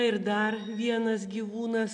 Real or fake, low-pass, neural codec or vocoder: real; 9.9 kHz; none